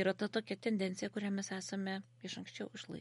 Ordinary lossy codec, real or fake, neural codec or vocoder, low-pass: MP3, 48 kbps; real; none; 19.8 kHz